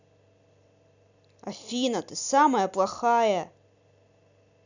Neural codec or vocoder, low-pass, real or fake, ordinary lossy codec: none; 7.2 kHz; real; none